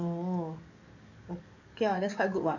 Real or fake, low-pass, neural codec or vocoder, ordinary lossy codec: fake; 7.2 kHz; codec, 44.1 kHz, 7.8 kbps, DAC; none